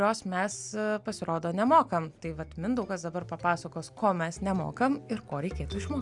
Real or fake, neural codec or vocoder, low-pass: real; none; 10.8 kHz